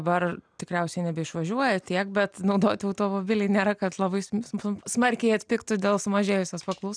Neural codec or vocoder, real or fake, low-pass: none; real; 9.9 kHz